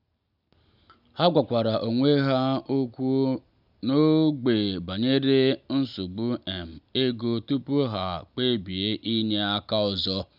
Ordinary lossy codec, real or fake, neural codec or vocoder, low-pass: none; real; none; 5.4 kHz